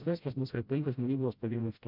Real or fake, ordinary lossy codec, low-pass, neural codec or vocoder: fake; MP3, 48 kbps; 5.4 kHz; codec, 16 kHz, 0.5 kbps, FreqCodec, smaller model